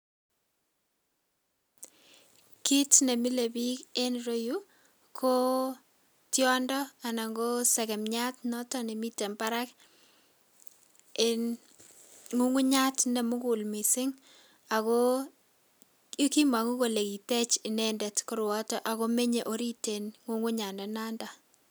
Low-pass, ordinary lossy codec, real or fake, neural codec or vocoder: none; none; real; none